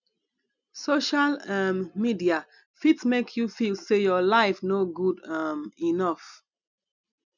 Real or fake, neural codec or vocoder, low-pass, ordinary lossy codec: real; none; 7.2 kHz; none